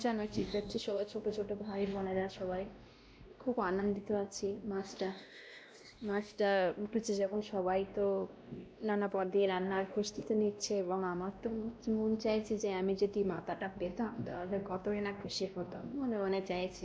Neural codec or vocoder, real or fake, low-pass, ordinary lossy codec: codec, 16 kHz, 1 kbps, X-Codec, WavLM features, trained on Multilingual LibriSpeech; fake; none; none